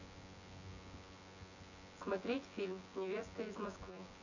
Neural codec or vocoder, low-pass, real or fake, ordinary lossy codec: vocoder, 24 kHz, 100 mel bands, Vocos; 7.2 kHz; fake; none